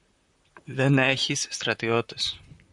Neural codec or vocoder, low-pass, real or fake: vocoder, 44.1 kHz, 128 mel bands, Pupu-Vocoder; 10.8 kHz; fake